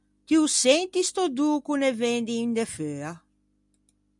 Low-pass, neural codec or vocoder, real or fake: 10.8 kHz; none; real